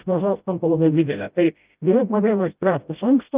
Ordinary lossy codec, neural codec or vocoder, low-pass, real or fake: Opus, 24 kbps; codec, 16 kHz, 0.5 kbps, FreqCodec, smaller model; 3.6 kHz; fake